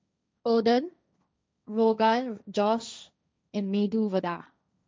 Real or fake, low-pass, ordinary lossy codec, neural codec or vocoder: fake; none; none; codec, 16 kHz, 1.1 kbps, Voila-Tokenizer